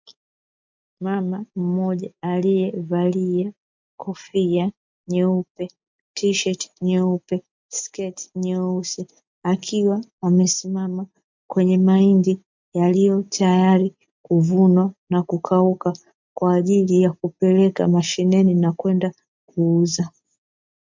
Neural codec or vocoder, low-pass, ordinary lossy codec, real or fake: none; 7.2 kHz; MP3, 64 kbps; real